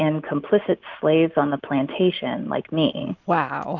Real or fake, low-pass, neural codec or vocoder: real; 7.2 kHz; none